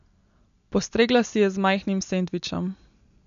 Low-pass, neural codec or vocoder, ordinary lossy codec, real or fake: 7.2 kHz; none; MP3, 64 kbps; real